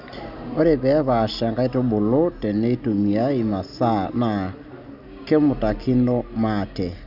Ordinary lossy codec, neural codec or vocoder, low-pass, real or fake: none; none; 5.4 kHz; real